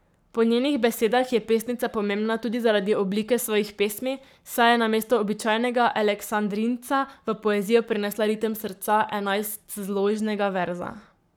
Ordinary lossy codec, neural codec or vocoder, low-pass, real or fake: none; codec, 44.1 kHz, 7.8 kbps, Pupu-Codec; none; fake